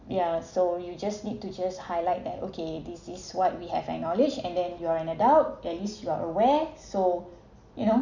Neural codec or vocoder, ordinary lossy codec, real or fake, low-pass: none; none; real; 7.2 kHz